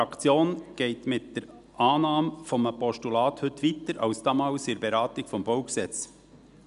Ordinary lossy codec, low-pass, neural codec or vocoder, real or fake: none; 10.8 kHz; none; real